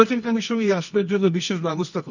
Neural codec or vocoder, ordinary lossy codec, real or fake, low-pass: codec, 24 kHz, 0.9 kbps, WavTokenizer, medium music audio release; none; fake; 7.2 kHz